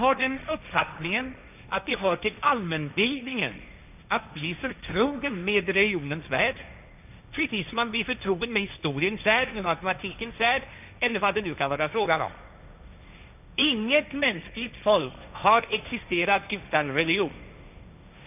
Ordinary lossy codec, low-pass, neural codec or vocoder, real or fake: none; 3.6 kHz; codec, 16 kHz, 1.1 kbps, Voila-Tokenizer; fake